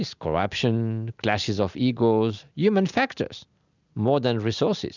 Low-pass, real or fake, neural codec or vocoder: 7.2 kHz; real; none